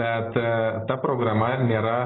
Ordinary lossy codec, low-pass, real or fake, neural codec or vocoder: AAC, 16 kbps; 7.2 kHz; real; none